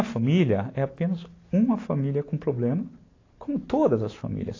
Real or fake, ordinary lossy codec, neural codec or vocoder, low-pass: real; AAC, 32 kbps; none; 7.2 kHz